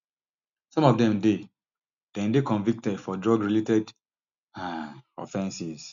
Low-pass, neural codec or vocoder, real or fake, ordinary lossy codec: 7.2 kHz; none; real; none